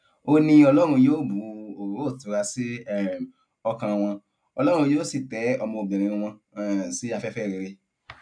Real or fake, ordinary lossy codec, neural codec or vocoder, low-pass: real; none; none; 9.9 kHz